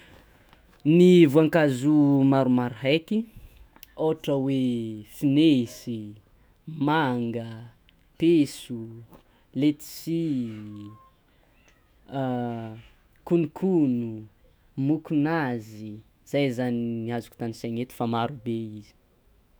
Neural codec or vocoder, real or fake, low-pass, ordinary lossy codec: autoencoder, 48 kHz, 128 numbers a frame, DAC-VAE, trained on Japanese speech; fake; none; none